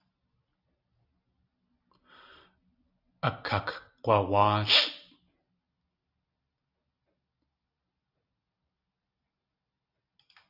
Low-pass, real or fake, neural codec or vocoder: 5.4 kHz; real; none